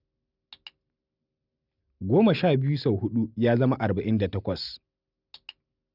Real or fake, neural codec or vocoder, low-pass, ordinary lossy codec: real; none; 5.4 kHz; none